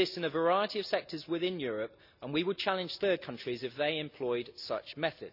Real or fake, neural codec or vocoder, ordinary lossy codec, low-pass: real; none; none; 5.4 kHz